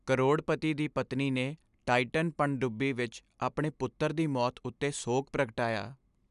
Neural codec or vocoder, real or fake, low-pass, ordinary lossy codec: none; real; 10.8 kHz; none